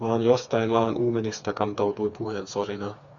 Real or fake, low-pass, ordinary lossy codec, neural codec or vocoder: fake; 7.2 kHz; Opus, 64 kbps; codec, 16 kHz, 4 kbps, FreqCodec, smaller model